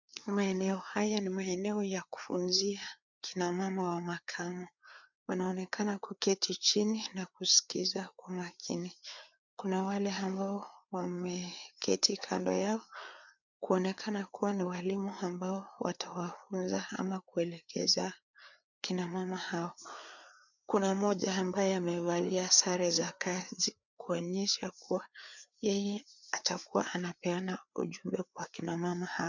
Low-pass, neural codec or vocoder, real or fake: 7.2 kHz; codec, 16 kHz, 4 kbps, FreqCodec, larger model; fake